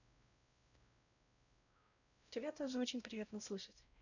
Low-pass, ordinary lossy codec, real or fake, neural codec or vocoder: 7.2 kHz; none; fake; codec, 16 kHz, 0.5 kbps, X-Codec, WavLM features, trained on Multilingual LibriSpeech